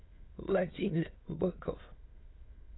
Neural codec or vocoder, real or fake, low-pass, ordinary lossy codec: autoencoder, 22.05 kHz, a latent of 192 numbers a frame, VITS, trained on many speakers; fake; 7.2 kHz; AAC, 16 kbps